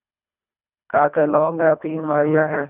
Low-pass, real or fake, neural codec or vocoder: 3.6 kHz; fake; codec, 24 kHz, 1.5 kbps, HILCodec